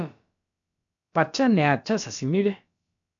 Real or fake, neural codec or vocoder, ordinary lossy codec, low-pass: fake; codec, 16 kHz, about 1 kbps, DyCAST, with the encoder's durations; AAC, 64 kbps; 7.2 kHz